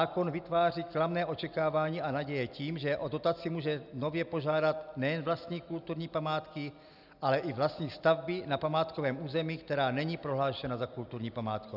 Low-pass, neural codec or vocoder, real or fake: 5.4 kHz; none; real